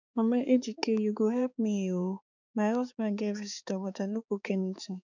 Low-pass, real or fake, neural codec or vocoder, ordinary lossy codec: 7.2 kHz; fake; codec, 16 kHz, 4 kbps, X-Codec, HuBERT features, trained on balanced general audio; none